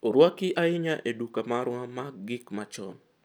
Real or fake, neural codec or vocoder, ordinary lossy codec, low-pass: real; none; none; none